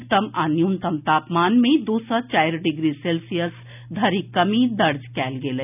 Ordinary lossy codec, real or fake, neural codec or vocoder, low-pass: none; real; none; 3.6 kHz